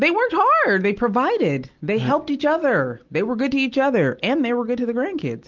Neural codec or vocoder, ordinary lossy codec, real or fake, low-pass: none; Opus, 24 kbps; real; 7.2 kHz